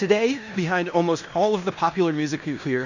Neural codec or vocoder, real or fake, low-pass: codec, 16 kHz in and 24 kHz out, 0.9 kbps, LongCat-Audio-Codec, fine tuned four codebook decoder; fake; 7.2 kHz